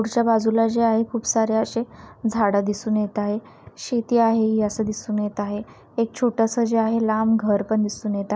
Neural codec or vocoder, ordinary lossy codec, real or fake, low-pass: none; none; real; none